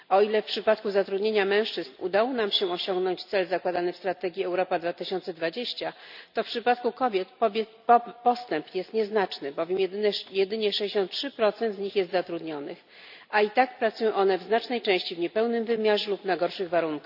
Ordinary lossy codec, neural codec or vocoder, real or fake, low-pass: none; none; real; 5.4 kHz